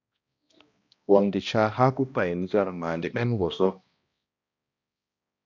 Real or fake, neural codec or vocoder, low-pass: fake; codec, 16 kHz, 1 kbps, X-Codec, HuBERT features, trained on balanced general audio; 7.2 kHz